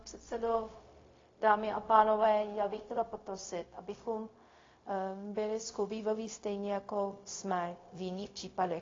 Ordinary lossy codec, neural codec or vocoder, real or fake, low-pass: AAC, 32 kbps; codec, 16 kHz, 0.4 kbps, LongCat-Audio-Codec; fake; 7.2 kHz